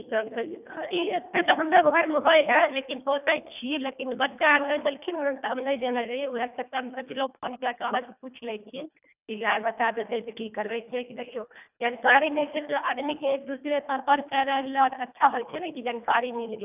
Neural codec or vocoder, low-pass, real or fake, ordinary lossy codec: codec, 24 kHz, 1.5 kbps, HILCodec; 3.6 kHz; fake; none